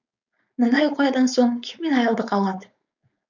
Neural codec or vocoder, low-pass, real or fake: codec, 16 kHz, 4.8 kbps, FACodec; 7.2 kHz; fake